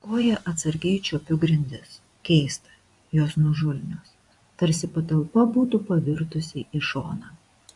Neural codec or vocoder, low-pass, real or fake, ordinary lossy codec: vocoder, 24 kHz, 100 mel bands, Vocos; 10.8 kHz; fake; AAC, 64 kbps